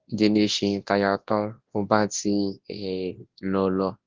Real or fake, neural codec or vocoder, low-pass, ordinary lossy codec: fake; codec, 24 kHz, 0.9 kbps, WavTokenizer, large speech release; 7.2 kHz; Opus, 16 kbps